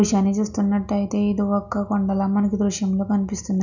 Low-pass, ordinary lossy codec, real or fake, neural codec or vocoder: 7.2 kHz; none; real; none